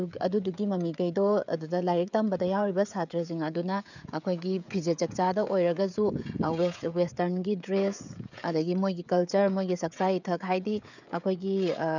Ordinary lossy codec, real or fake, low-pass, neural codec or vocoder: none; fake; 7.2 kHz; codec, 16 kHz, 16 kbps, FreqCodec, smaller model